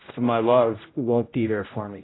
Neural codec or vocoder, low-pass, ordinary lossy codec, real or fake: codec, 16 kHz, 0.5 kbps, X-Codec, HuBERT features, trained on general audio; 7.2 kHz; AAC, 16 kbps; fake